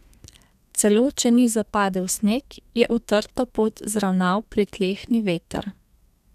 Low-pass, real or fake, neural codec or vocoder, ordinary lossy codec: 14.4 kHz; fake; codec, 32 kHz, 1.9 kbps, SNAC; none